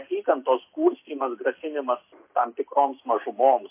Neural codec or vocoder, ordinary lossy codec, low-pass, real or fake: none; MP3, 24 kbps; 3.6 kHz; real